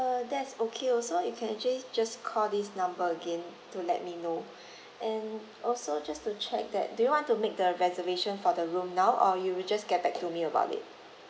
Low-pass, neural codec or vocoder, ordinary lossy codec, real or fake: none; none; none; real